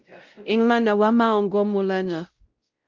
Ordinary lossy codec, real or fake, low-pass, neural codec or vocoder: Opus, 32 kbps; fake; 7.2 kHz; codec, 16 kHz, 0.5 kbps, X-Codec, WavLM features, trained on Multilingual LibriSpeech